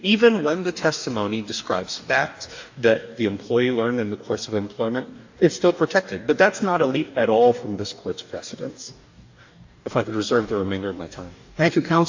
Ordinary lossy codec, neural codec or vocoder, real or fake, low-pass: AAC, 48 kbps; codec, 44.1 kHz, 2.6 kbps, DAC; fake; 7.2 kHz